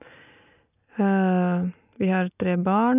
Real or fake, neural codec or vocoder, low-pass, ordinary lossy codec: real; none; 3.6 kHz; none